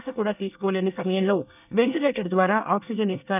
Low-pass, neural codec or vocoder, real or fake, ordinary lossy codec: 3.6 kHz; codec, 24 kHz, 1 kbps, SNAC; fake; none